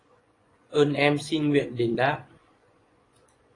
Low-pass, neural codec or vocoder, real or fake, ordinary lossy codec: 10.8 kHz; vocoder, 24 kHz, 100 mel bands, Vocos; fake; AAC, 32 kbps